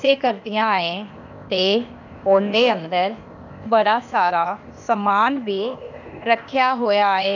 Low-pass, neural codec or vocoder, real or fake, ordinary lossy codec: 7.2 kHz; codec, 16 kHz, 0.8 kbps, ZipCodec; fake; none